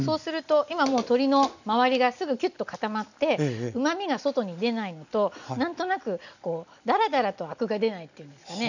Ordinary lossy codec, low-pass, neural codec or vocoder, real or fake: none; 7.2 kHz; none; real